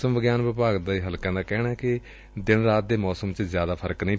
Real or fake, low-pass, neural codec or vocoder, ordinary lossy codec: real; none; none; none